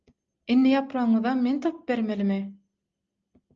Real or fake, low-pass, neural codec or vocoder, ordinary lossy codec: real; 7.2 kHz; none; Opus, 24 kbps